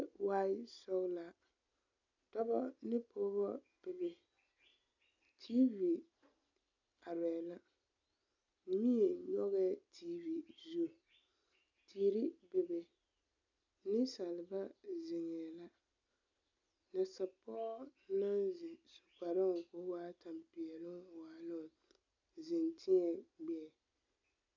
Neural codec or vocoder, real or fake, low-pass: none; real; 7.2 kHz